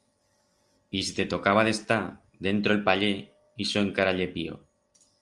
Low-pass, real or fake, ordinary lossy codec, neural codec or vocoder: 10.8 kHz; real; Opus, 32 kbps; none